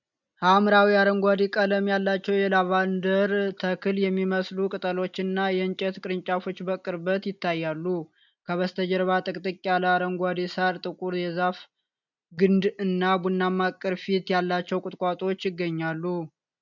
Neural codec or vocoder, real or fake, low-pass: none; real; 7.2 kHz